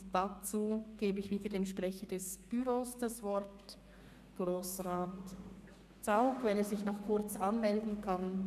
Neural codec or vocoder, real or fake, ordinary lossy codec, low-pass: codec, 32 kHz, 1.9 kbps, SNAC; fake; none; 14.4 kHz